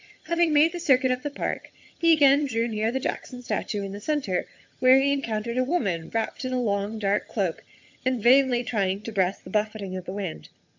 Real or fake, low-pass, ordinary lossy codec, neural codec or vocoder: fake; 7.2 kHz; MP3, 64 kbps; vocoder, 22.05 kHz, 80 mel bands, HiFi-GAN